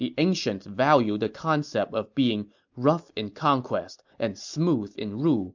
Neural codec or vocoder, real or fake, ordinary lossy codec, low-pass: none; real; MP3, 64 kbps; 7.2 kHz